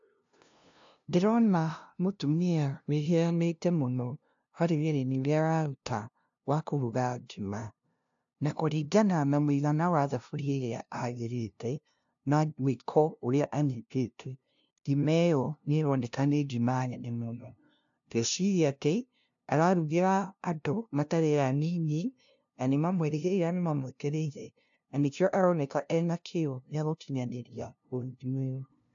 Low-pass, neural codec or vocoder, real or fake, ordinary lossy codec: 7.2 kHz; codec, 16 kHz, 0.5 kbps, FunCodec, trained on LibriTTS, 25 frames a second; fake; AAC, 64 kbps